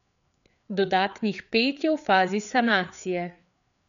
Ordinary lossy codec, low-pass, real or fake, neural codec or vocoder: none; 7.2 kHz; fake; codec, 16 kHz, 4 kbps, FreqCodec, larger model